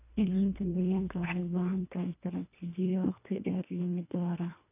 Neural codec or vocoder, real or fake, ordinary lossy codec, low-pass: codec, 24 kHz, 1.5 kbps, HILCodec; fake; none; 3.6 kHz